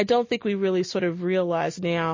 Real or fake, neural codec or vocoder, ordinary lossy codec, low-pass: real; none; MP3, 32 kbps; 7.2 kHz